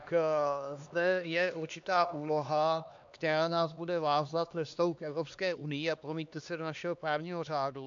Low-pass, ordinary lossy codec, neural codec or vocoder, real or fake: 7.2 kHz; AAC, 64 kbps; codec, 16 kHz, 2 kbps, X-Codec, HuBERT features, trained on LibriSpeech; fake